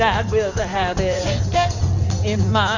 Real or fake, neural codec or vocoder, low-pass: fake; codec, 16 kHz in and 24 kHz out, 2.2 kbps, FireRedTTS-2 codec; 7.2 kHz